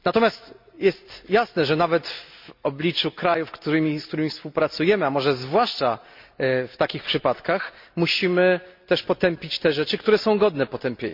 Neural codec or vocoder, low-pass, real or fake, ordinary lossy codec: none; 5.4 kHz; real; MP3, 48 kbps